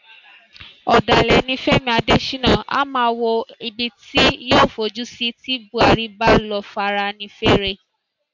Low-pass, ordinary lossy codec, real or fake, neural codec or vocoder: 7.2 kHz; MP3, 64 kbps; real; none